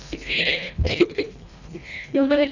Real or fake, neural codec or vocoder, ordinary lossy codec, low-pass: fake; codec, 24 kHz, 1.5 kbps, HILCodec; none; 7.2 kHz